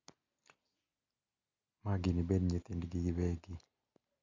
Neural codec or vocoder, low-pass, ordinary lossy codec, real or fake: none; 7.2 kHz; none; real